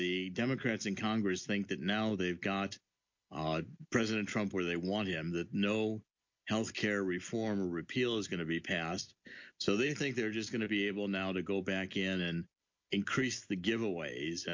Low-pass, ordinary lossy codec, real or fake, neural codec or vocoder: 7.2 kHz; MP3, 48 kbps; real; none